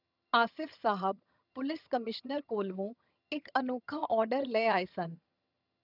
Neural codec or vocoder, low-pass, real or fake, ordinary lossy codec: vocoder, 22.05 kHz, 80 mel bands, HiFi-GAN; 5.4 kHz; fake; none